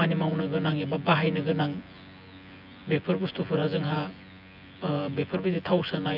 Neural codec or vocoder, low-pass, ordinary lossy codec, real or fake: vocoder, 24 kHz, 100 mel bands, Vocos; 5.4 kHz; none; fake